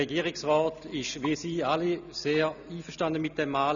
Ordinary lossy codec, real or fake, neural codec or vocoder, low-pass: none; real; none; 7.2 kHz